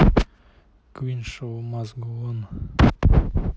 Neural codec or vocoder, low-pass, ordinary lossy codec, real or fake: none; none; none; real